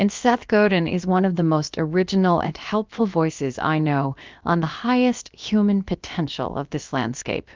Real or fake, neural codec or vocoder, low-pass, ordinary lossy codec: fake; codec, 16 kHz, about 1 kbps, DyCAST, with the encoder's durations; 7.2 kHz; Opus, 24 kbps